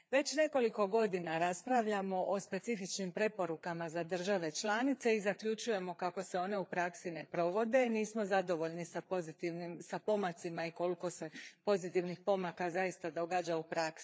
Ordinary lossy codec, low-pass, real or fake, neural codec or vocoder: none; none; fake; codec, 16 kHz, 4 kbps, FreqCodec, larger model